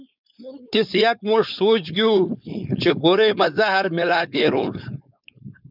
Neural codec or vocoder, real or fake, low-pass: codec, 16 kHz, 4.8 kbps, FACodec; fake; 5.4 kHz